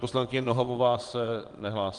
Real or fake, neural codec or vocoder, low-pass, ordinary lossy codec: fake; vocoder, 22.05 kHz, 80 mel bands, WaveNeXt; 9.9 kHz; Opus, 24 kbps